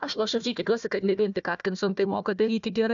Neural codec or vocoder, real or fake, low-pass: codec, 16 kHz, 1 kbps, FunCodec, trained on Chinese and English, 50 frames a second; fake; 7.2 kHz